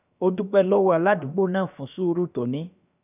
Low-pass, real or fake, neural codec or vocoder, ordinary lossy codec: 3.6 kHz; fake; codec, 16 kHz, about 1 kbps, DyCAST, with the encoder's durations; none